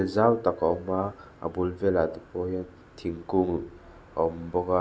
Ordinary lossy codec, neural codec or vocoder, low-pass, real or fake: none; none; none; real